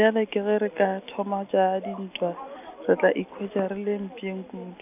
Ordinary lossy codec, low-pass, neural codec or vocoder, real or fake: none; 3.6 kHz; none; real